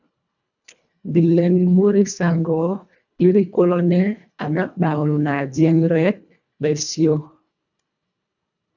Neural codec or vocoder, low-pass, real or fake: codec, 24 kHz, 1.5 kbps, HILCodec; 7.2 kHz; fake